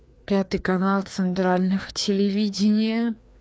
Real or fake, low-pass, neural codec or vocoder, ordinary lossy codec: fake; none; codec, 16 kHz, 2 kbps, FreqCodec, larger model; none